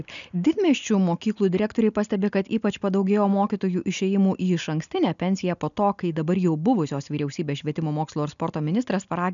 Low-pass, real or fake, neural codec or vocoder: 7.2 kHz; real; none